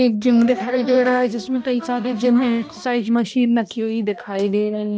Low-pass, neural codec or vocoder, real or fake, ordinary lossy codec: none; codec, 16 kHz, 1 kbps, X-Codec, HuBERT features, trained on balanced general audio; fake; none